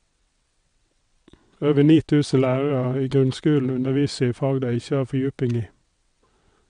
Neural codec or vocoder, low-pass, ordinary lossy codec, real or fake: vocoder, 22.05 kHz, 80 mel bands, WaveNeXt; 9.9 kHz; MP3, 64 kbps; fake